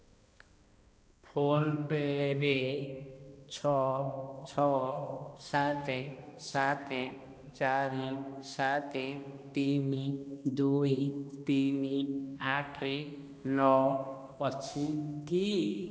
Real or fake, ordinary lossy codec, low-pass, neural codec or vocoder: fake; none; none; codec, 16 kHz, 1 kbps, X-Codec, HuBERT features, trained on balanced general audio